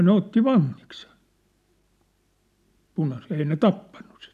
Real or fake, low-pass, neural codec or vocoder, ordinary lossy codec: real; 14.4 kHz; none; none